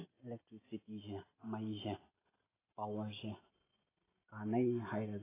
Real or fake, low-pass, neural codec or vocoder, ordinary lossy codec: real; 3.6 kHz; none; AAC, 16 kbps